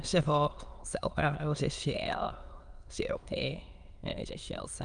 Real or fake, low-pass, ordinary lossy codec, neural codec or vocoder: fake; 9.9 kHz; Opus, 32 kbps; autoencoder, 22.05 kHz, a latent of 192 numbers a frame, VITS, trained on many speakers